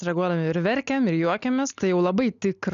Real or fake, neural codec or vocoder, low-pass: real; none; 7.2 kHz